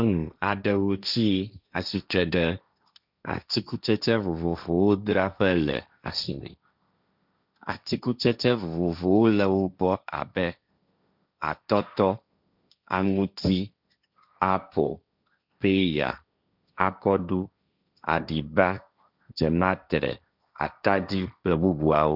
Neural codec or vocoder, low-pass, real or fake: codec, 16 kHz, 1.1 kbps, Voila-Tokenizer; 5.4 kHz; fake